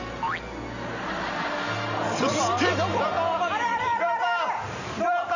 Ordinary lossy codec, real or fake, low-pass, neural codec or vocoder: none; real; 7.2 kHz; none